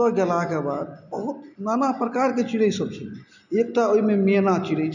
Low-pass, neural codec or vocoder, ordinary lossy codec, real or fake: 7.2 kHz; none; none; real